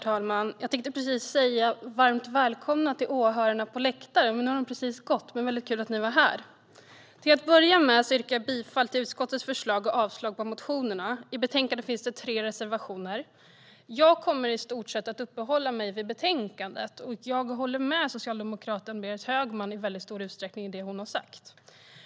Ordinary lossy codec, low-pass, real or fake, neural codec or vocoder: none; none; real; none